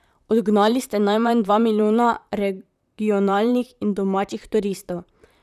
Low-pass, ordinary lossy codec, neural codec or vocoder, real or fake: 14.4 kHz; none; vocoder, 44.1 kHz, 128 mel bands, Pupu-Vocoder; fake